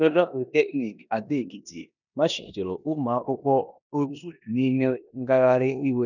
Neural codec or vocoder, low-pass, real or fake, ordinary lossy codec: codec, 16 kHz in and 24 kHz out, 0.9 kbps, LongCat-Audio-Codec, four codebook decoder; 7.2 kHz; fake; none